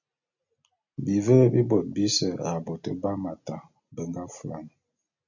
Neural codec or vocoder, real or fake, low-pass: none; real; 7.2 kHz